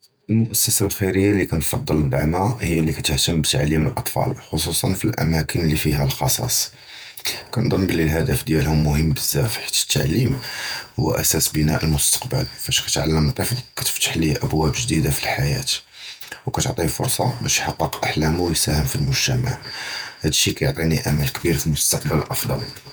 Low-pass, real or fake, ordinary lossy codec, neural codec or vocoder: none; fake; none; vocoder, 48 kHz, 128 mel bands, Vocos